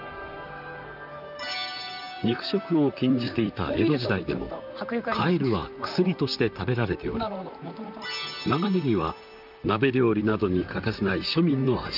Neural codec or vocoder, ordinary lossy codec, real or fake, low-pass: vocoder, 44.1 kHz, 128 mel bands, Pupu-Vocoder; none; fake; 5.4 kHz